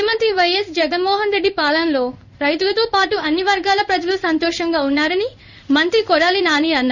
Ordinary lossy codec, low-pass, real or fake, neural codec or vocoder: none; 7.2 kHz; fake; codec, 16 kHz in and 24 kHz out, 1 kbps, XY-Tokenizer